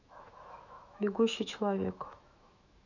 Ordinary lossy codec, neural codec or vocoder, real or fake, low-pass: none; autoencoder, 48 kHz, 128 numbers a frame, DAC-VAE, trained on Japanese speech; fake; 7.2 kHz